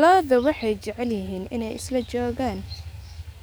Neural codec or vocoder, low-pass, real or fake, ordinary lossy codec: codec, 44.1 kHz, 7.8 kbps, DAC; none; fake; none